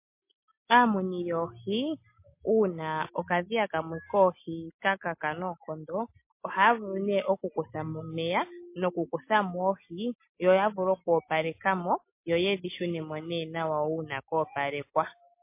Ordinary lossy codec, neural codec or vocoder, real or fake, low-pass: MP3, 24 kbps; none; real; 3.6 kHz